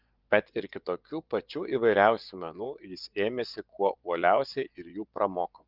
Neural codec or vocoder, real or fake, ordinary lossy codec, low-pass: vocoder, 24 kHz, 100 mel bands, Vocos; fake; Opus, 32 kbps; 5.4 kHz